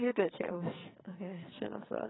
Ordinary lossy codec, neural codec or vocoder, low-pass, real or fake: AAC, 16 kbps; codec, 44.1 kHz, 2.6 kbps, SNAC; 7.2 kHz; fake